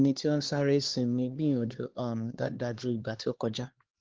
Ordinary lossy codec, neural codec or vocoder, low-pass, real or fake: Opus, 16 kbps; codec, 16 kHz, 2 kbps, X-Codec, HuBERT features, trained on LibriSpeech; 7.2 kHz; fake